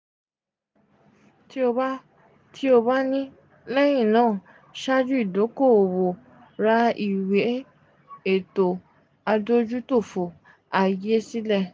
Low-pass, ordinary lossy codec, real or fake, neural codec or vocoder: none; none; real; none